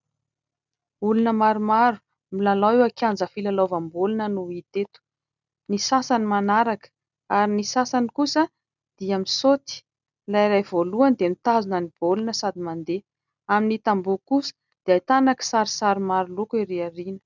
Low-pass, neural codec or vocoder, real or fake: 7.2 kHz; none; real